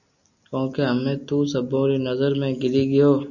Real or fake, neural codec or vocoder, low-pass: real; none; 7.2 kHz